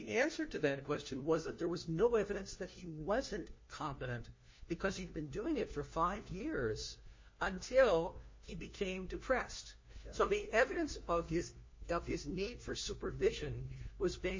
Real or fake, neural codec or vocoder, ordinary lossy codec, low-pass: fake; codec, 16 kHz, 1 kbps, FunCodec, trained on LibriTTS, 50 frames a second; MP3, 32 kbps; 7.2 kHz